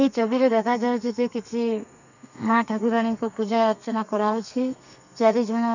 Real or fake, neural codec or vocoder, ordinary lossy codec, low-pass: fake; codec, 32 kHz, 1.9 kbps, SNAC; none; 7.2 kHz